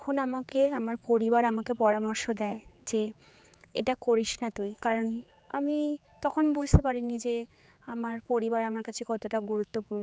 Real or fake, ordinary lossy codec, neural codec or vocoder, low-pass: fake; none; codec, 16 kHz, 4 kbps, X-Codec, HuBERT features, trained on general audio; none